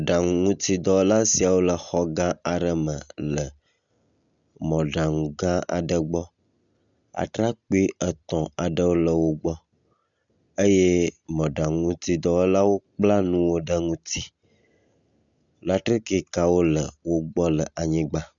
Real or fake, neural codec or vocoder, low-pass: real; none; 7.2 kHz